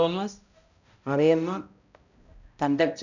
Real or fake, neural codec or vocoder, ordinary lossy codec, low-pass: fake; codec, 16 kHz, 0.5 kbps, X-Codec, HuBERT features, trained on balanced general audio; none; 7.2 kHz